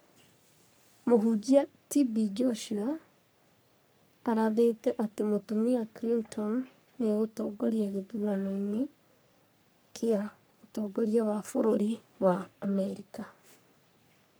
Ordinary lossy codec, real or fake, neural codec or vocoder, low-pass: none; fake; codec, 44.1 kHz, 3.4 kbps, Pupu-Codec; none